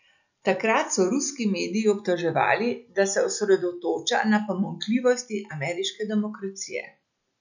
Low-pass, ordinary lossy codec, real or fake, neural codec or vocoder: 7.2 kHz; none; real; none